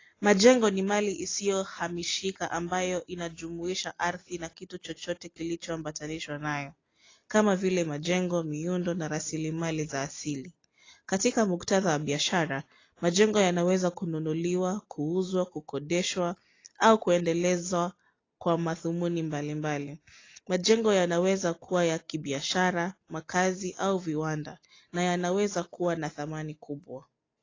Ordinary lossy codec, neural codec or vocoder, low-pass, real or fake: AAC, 32 kbps; none; 7.2 kHz; real